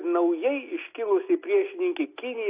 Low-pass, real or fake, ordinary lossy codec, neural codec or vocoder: 3.6 kHz; real; AAC, 24 kbps; none